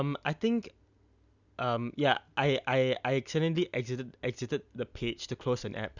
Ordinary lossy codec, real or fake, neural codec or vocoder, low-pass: none; real; none; 7.2 kHz